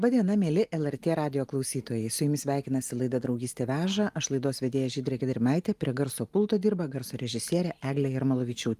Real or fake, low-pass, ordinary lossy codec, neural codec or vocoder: real; 14.4 kHz; Opus, 32 kbps; none